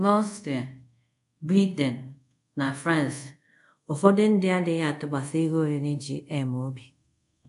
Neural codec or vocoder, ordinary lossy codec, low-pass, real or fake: codec, 24 kHz, 0.5 kbps, DualCodec; none; 10.8 kHz; fake